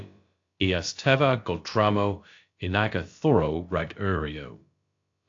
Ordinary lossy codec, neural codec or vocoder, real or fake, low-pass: AAC, 48 kbps; codec, 16 kHz, about 1 kbps, DyCAST, with the encoder's durations; fake; 7.2 kHz